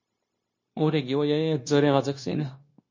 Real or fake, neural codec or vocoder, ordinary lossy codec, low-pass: fake; codec, 16 kHz, 0.9 kbps, LongCat-Audio-Codec; MP3, 32 kbps; 7.2 kHz